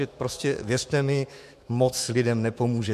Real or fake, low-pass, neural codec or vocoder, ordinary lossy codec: fake; 14.4 kHz; autoencoder, 48 kHz, 128 numbers a frame, DAC-VAE, trained on Japanese speech; MP3, 64 kbps